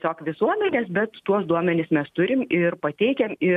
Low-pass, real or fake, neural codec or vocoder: 14.4 kHz; real; none